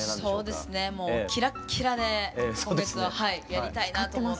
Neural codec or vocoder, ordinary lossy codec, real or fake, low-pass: none; none; real; none